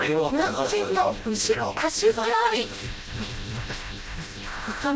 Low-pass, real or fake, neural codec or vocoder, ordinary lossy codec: none; fake; codec, 16 kHz, 0.5 kbps, FreqCodec, smaller model; none